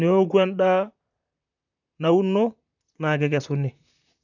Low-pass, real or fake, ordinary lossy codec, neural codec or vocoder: 7.2 kHz; fake; none; vocoder, 44.1 kHz, 128 mel bands, Pupu-Vocoder